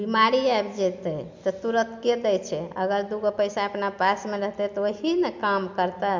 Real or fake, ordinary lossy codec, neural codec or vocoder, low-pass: real; MP3, 64 kbps; none; 7.2 kHz